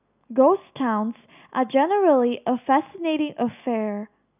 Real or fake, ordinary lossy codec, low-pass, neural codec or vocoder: real; none; 3.6 kHz; none